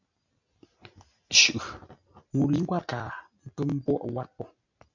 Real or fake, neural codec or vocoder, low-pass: real; none; 7.2 kHz